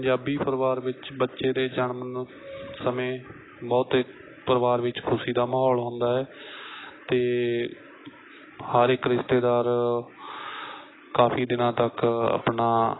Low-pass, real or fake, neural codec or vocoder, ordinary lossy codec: 7.2 kHz; real; none; AAC, 16 kbps